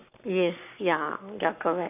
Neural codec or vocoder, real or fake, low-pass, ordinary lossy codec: codec, 44.1 kHz, 7.8 kbps, Pupu-Codec; fake; 3.6 kHz; none